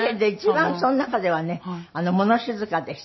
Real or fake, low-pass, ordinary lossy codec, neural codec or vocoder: real; 7.2 kHz; MP3, 24 kbps; none